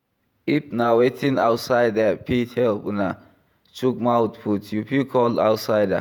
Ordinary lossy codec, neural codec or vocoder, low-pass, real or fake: none; vocoder, 48 kHz, 128 mel bands, Vocos; 19.8 kHz; fake